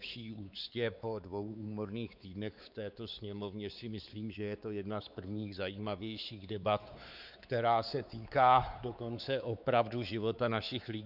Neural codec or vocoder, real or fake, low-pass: codec, 16 kHz, 4 kbps, X-Codec, WavLM features, trained on Multilingual LibriSpeech; fake; 5.4 kHz